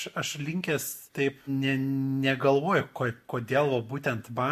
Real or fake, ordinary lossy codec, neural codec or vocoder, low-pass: real; MP3, 64 kbps; none; 14.4 kHz